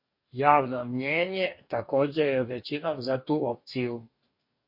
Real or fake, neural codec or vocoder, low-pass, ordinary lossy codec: fake; codec, 44.1 kHz, 2.6 kbps, DAC; 5.4 kHz; MP3, 32 kbps